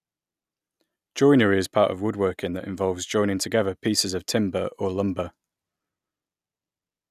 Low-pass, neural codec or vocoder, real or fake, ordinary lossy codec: 14.4 kHz; none; real; none